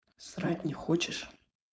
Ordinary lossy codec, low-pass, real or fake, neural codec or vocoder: none; none; fake; codec, 16 kHz, 4.8 kbps, FACodec